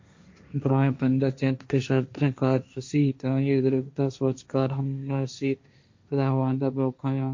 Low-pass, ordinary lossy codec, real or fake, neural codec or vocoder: 7.2 kHz; MP3, 48 kbps; fake; codec, 16 kHz, 1.1 kbps, Voila-Tokenizer